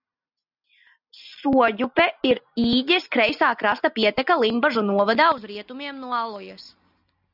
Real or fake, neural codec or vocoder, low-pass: real; none; 5.4 kHz